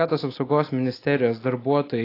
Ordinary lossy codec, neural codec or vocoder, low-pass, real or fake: AAC, 24 kbps; none; 5.4 kHz; real